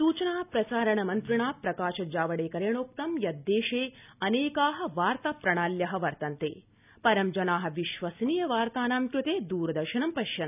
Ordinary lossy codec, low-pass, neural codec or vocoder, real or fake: none; 3.6 kHz; none; real